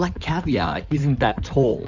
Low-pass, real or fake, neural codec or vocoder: 7.2 kHz; fake; codec, 16 kHz in and 24 kHz out, 2.2 kbps, FireRedTTS-2 codec